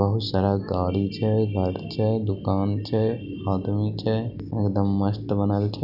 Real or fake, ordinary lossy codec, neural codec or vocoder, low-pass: real; none; none; 5.4 kHz